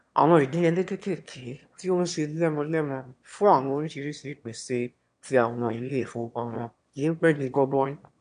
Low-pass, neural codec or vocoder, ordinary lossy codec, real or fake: 9.9 kHz; autoencoder, 22.05 kHz, a latent of 192 numbers a frame, VITS, trained on one speaker; AAC, 64 kbps; fake